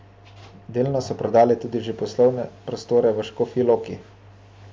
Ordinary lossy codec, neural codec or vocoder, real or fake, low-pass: none; none; real; none